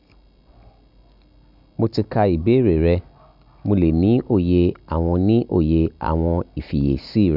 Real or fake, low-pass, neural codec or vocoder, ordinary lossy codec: real; 5.4 kHz; none; none